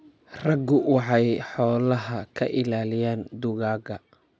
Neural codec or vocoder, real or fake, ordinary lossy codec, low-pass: none; real; none; none